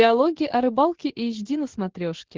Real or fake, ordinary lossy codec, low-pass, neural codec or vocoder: real; Opus, 16 kbps; 7.2 kHz; none